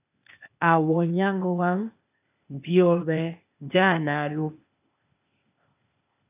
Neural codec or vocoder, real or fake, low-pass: codec, 16 kHz, 0.8 kbps, ZipCodec; fake; 3.6 kHz